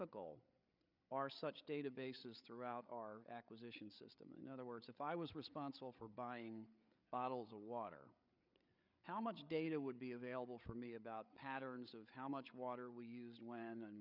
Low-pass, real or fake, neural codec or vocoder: 5.4 kHz; fake; codec, 16 kHz, 8 kbps, FreqCodec, larger model